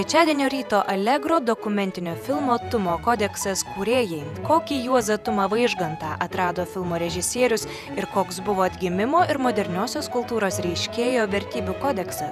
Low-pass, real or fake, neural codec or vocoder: 14.4 kHz; fake; vocoder, 48 kHz, 128 mel bands, Vocos